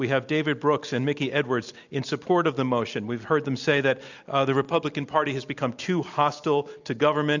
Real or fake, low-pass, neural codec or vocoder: real; 7.2 kHz; none